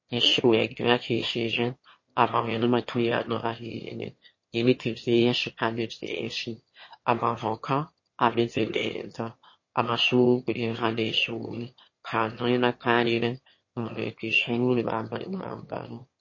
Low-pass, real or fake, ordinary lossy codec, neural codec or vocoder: 7.2 kHz; fake; MP3, 32 kbps; autoencoder, 22.05 kHz, a latent of 192 numbers a frame, VITS, trained on one speaker